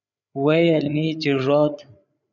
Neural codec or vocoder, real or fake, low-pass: codec, 16 kHz, 8 kbps, FreqCodec, larger model; fake; 7.2 kHz